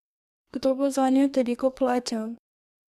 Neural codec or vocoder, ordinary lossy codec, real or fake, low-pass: codec, 32 kHz, 1.9 kbps, SNAC; none; fake; 14.4 kHz